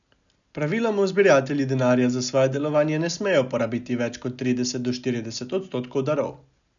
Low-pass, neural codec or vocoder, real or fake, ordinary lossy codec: 7.2 kHz; none; real; none